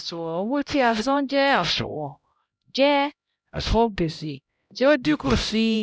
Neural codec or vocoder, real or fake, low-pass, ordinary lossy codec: codec, 16 kHz, 0.5 kbps, X-Codec, HuBERT features, trained on LibriSpeech; fake; none; none